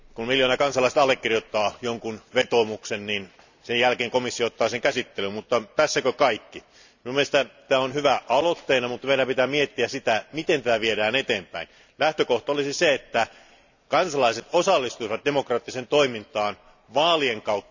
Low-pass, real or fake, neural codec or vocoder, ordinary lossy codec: 7.2 kHz; real; none; none